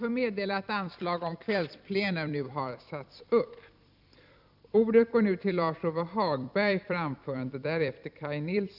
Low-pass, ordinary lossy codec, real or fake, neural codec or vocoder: 5.4 kHz; none; fake; vocoder, 44.1 kHz, 128 mel bands every 256 samples, BigVGAN v2